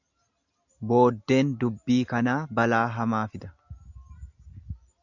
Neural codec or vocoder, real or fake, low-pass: none; real; 7.2 kHz